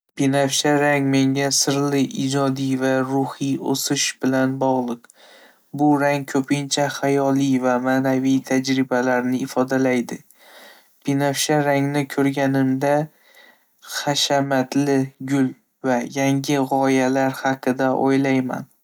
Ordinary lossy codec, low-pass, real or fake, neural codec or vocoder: none; none; real; none